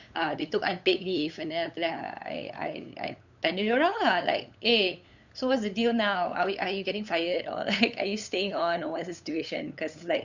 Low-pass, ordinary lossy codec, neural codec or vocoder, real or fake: 7.2 kHz; none; codec, 16 kHz, 8 kbps, FunCodec, trained on LibriTTS, 25 frames a second; fake